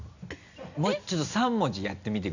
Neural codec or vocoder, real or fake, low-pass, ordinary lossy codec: none; real; 7.2 kHz; none